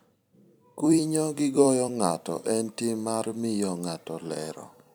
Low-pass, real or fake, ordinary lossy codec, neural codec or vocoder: none; fake; none; vocoder, 44.1 kHz, 128 mel bands every 256 samples, BigVGAN v2